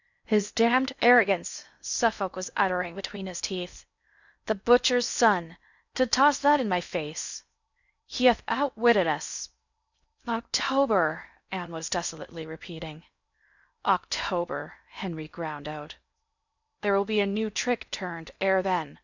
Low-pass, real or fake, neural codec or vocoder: 7.2 kHz; fake; codec, 16 kHz in and 24 kHz out, 0.6 kbps, FocalCodec, streaming, 2048 codes